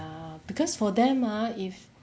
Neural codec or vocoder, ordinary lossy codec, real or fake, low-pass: none; none; real; none